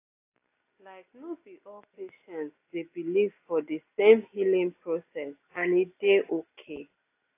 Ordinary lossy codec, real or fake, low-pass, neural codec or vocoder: AAC, 24 kbps; real; 3.6 kHz; none